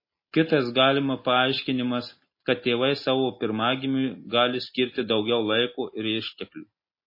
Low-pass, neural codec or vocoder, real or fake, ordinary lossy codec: 5.4 kHz; none; real; MP3, 24 kbps